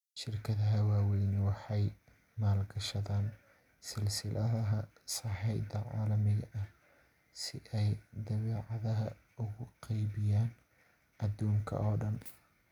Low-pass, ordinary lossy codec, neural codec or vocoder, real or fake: 19.8 kHz; none; none; real